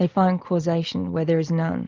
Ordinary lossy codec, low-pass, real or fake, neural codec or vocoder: Opus, 32 kbps; 7.2 kHz; real; none